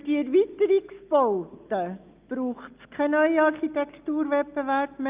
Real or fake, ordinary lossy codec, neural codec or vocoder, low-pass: real; Opus, 24 kbps; none; 3.6 kHz